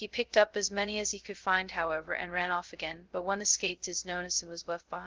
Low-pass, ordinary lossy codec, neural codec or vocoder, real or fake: 7.2 kHz; Opus, 16 kbps; codec, 16 kHz, 0.2 kbps, FocalCodec; fake